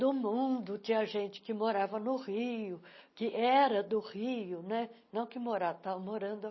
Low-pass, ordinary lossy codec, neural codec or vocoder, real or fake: 7.2 kHz; MP3, 24 kbps; none; real